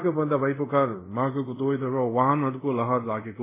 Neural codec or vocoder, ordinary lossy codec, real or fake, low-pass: codec, 24 kHz, 0.5 kbps, DualCodec; MP3, 16 kbps; fake; 3.6 kHz